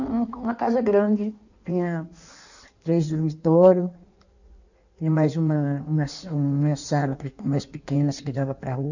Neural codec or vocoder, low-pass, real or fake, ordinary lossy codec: codec, 16 kHz in and 24 kHz out, 1.1 kbps, FireRedTTS-2 codec; 7.2 kHz; fake; none